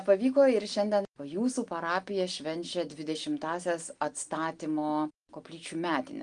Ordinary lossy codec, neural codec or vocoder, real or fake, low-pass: Opus, 24 kbps; none; real; 9.9 kHz